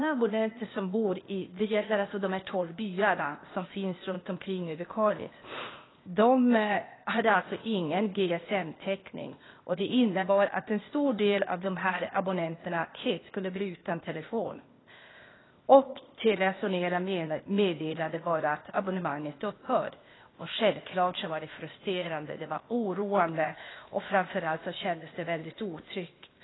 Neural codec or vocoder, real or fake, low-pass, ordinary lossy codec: codec, 16 kHz, 0.8 kbps, ZipCodec; fake; 7.2 kHz; AAC, 16 kbps